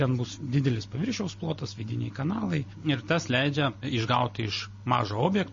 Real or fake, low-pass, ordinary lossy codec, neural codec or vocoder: real; 7.2 kHz; MP3, 32 kbps; none